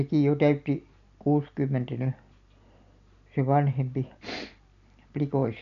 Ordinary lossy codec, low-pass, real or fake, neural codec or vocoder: none; 7.2 kHz; real; none